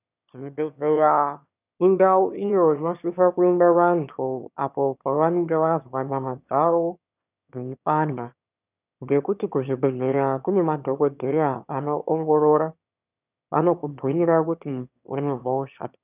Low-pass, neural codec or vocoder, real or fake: 3.6 kHz; autoencoder, 22.05 kHz, a latent of 192 numbers a frame, VITS, trained on one speaker; fake